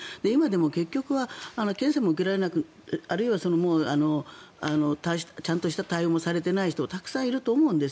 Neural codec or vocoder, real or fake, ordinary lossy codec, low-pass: none; real; none; none